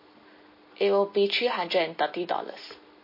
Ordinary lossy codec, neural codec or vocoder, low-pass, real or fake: MP3, 24 kbps; none; 5.4 kHz; real